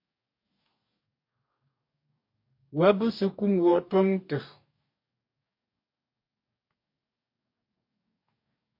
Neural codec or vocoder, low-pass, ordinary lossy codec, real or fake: codec, 44.1 kHz, 2.6 kbps, DAC; 5.4 kHz; MP3, 32 kbps; fake